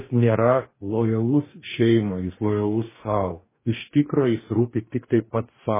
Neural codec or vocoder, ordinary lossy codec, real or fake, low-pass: codec, 44.1 kHz, 2.6 kbps, DAC; MP3, 16 kbps; fake; 3.6 kHz